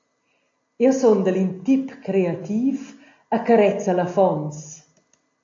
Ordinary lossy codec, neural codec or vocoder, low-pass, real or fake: AAC, 48 kbps; none; 7.2 kHz; real